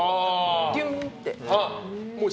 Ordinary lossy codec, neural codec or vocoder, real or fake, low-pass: none; none; real; none